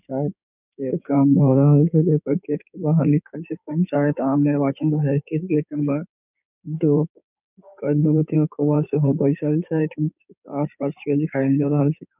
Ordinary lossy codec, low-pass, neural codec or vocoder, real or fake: none; 3.6 kHz; codec, 16 kHz in and 24 kHz out, 2.2 kbps, FireRedTTS-2 codec; fake